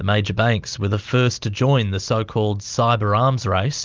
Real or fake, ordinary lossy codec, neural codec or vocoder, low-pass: real; Opus, 32 kbps; none; 7.2 kHz